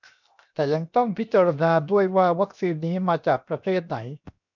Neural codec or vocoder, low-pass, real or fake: codec, 16 kHz, 0.7 kbps, FocalCodec; 7.2 kHz; fake